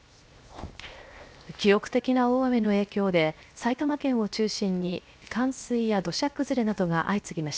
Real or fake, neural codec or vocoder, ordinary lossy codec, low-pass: fake; codec, 16 kHz, 0.7 kbps, FocalCodec; none; none